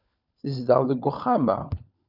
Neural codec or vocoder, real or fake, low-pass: codec, 16 kHz, 16 kbps, FunCodec, trained on LibriTTS, 50 frames a second; fake; 5.4 kHz